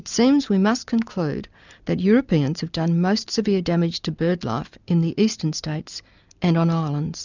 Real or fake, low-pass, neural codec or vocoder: real; 7.2 kHz; none